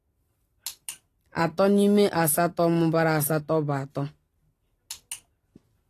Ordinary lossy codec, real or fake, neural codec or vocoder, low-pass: AAC, 48 kbps; real; none; 14.4 kHz